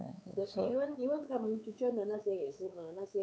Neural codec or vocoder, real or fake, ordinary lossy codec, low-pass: codec, 16 kHz, 4 kbps, X-Codec, WavLM features, trained on Multilingual LibriSpeech; fake; none; none